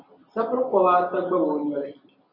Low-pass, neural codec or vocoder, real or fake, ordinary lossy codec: 5.4 kHz; none; real; MP3, 48 kbps